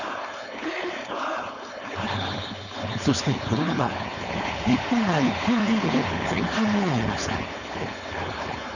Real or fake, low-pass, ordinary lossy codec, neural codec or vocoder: fake; 7.2 kHz; none; codec, 16 kHz, 4.8 kbps, FACodec